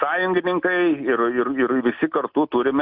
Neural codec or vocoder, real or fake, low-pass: none; real; 5.4 kHz